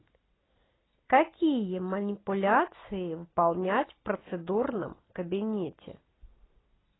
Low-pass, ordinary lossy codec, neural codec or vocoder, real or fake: 7.2 kHz; AAC, 16 kbps; none; real